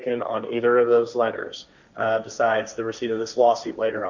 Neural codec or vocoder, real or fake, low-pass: codec, 16 kHz, 1.1 kbps, Voila-Tokenizer; fake; 7.2 kHz